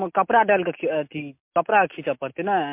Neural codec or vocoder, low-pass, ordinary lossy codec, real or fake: none; 3.6 kHz; MP3, 32 kbps; real